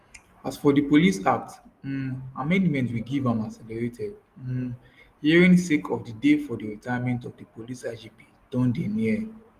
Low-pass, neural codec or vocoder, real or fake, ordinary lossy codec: 14.4 kHz; none; real; Opus, 24 kbps